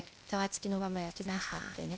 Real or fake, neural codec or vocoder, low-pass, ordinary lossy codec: fake; codec, 16 kHz, 0.8 kbps, ZipCodec; none; none